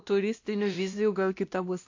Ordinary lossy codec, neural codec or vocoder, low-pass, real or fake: AAC, 48 kbps; codec, 16 kHz, 1 kbps, X-Codec, WavLM features, trained on Multilingual LibriSpeech; 7.2 kHz; fake